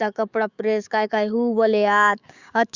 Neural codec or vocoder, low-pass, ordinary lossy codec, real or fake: codec, 16 kHz, 6 kbps, DAC; 7.2 kHz; Opus, 64 kbps; fake